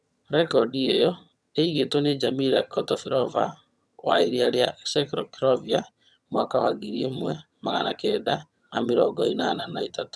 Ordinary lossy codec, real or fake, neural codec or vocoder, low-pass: none; fake; vocoder, 22.05 kHz, 80 mel bands, HiFi-GAN; none